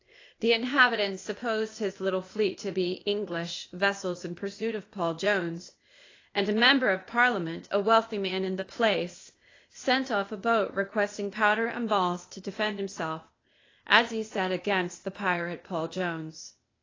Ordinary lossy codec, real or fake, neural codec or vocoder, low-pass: AAC, 32 kbps; fake; codec, 16 kHz, 0.8 kbps, ZipCodec; 7.2 kHz